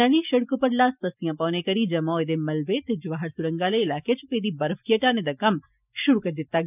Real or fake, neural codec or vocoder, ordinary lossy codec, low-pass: real; none; none; 3.6 kHz